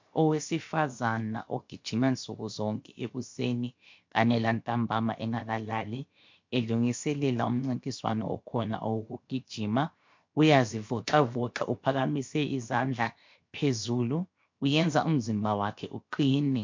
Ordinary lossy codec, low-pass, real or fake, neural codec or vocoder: MP3, 48 kbps; 7.2 kHz; fake; codec, 16 kHz, 0.7 kbps, FocalCodec